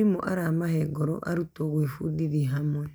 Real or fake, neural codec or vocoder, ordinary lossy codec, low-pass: real; none; none; none